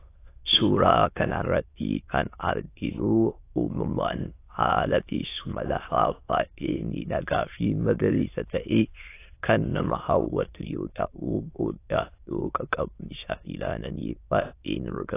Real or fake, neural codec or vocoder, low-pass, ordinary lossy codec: fake; autoencoder, 22.05 kHz, a latent of 192 numbers a frame, VITS, trained on many speakers; 3.6 kHz; AAC, 24 kbps